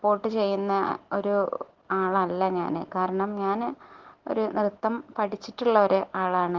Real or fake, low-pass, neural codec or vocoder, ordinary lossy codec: real; 7.2 kHz; none; Opus, 16 kbps